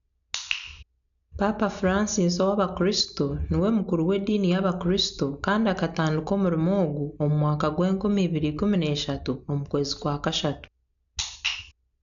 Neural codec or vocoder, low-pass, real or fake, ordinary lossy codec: none; 7.2 kHz; real; none